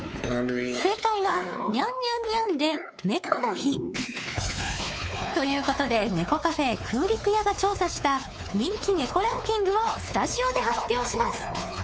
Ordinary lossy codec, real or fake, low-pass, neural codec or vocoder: none; fake; none; codec, 16 kHz, 4 kbps, X-Codec, WavLM features, trained on Multilingual LibriSpeech